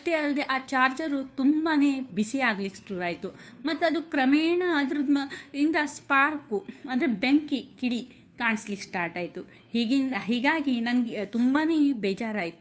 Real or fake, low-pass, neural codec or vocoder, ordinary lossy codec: fake; none; codec, 16 kHz, 2 kbps, FunCodec, trained on Chinese and English, 25 frames a second; none